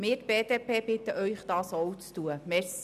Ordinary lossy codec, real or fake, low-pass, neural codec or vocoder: none; real; 14.4 kHz; none